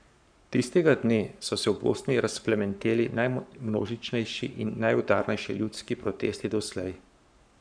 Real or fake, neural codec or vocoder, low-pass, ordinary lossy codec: fake; codec, 44.1 kHz, 7.8 kbps, Pupu-Codec; 9.9 kHz; none